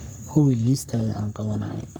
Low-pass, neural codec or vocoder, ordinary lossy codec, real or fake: none; codec, 44.1 kHz, 3.4 kbps, Pupu-Codec; none; fake